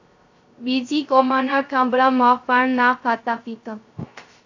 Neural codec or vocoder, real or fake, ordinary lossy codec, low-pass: codec, 16 kHz, 0.3 kbps, FocalCodec; fake; AAC, 48 kbps; 7.2 kHz